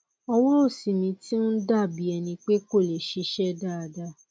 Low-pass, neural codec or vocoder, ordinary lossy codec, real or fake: none; none; none; real